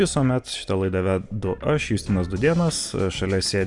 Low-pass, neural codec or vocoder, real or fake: 10.8 kHz; none; real